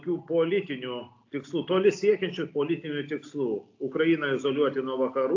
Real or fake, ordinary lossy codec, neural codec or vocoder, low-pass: real; AAC, 48 kbps; none; 7.2 kHz